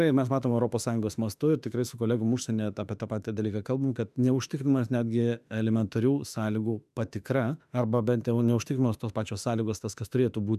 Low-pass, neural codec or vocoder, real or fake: 14.4 kHz; autoencoder, 48 kHz, 32 numbers a frame, DAC-VAE, trained on Japanese speech; fake